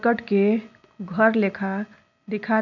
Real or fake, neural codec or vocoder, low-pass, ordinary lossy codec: real; none; 7.2 kHz; none